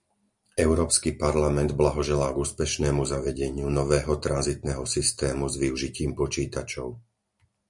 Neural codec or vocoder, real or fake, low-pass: none; real; 10.8 kHz